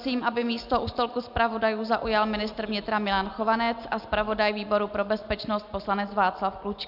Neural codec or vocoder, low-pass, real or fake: none; 5.4 kHz; real